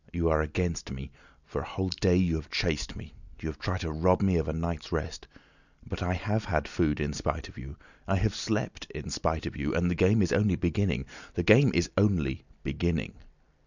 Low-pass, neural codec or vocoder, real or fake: 7.2 kHz; none; real